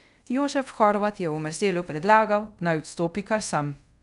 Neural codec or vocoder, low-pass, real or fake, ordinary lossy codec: codec, 24 kHz, 0.5 kbps, DualCodec; 10.8 kHz; fake; none